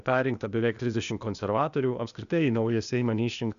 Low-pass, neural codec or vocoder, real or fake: 7.2 kHz; codec, 16 kHz, 0.8 kbps, ZipCodec; fake